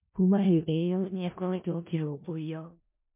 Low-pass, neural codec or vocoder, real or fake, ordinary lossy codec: 3.6 kHz; codec, 16 kHz in and 24 kHz out, 0.4 kbps, LongCat-Audio-Codec, four codebook decoder; fake; MP3, 32 kbps